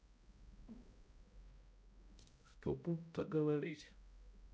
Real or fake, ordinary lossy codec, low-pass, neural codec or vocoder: fake; none; none; codec, 16 kHz, 0.5 kbps, X-Codec, HuBERT features, trained on balanced general audio